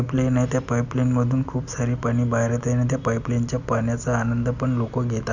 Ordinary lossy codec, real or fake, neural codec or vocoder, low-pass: none; real; none; 7.2 kHz